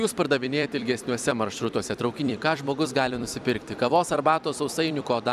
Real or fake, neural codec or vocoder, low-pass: fake; vocoder, 44.1 kHz, 128 mel bands every 256 samples, BigVGAN v2; 14.4 kHz